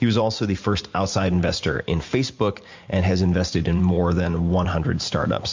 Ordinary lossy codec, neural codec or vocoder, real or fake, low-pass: MP3, 48 kbps; vocoder, 44.1 kHz, 128 mel bands every 512 samples, BigVGAN v2; fake; 7.2 kHz